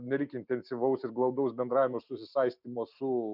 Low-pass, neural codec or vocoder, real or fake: 5.4 kHz; none; real